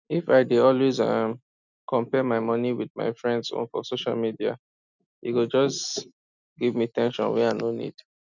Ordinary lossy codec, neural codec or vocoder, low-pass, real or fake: none; none; 7.2 kHz; real